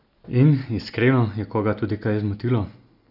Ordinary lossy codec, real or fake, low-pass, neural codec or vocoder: none; real; 5.4 kHz; none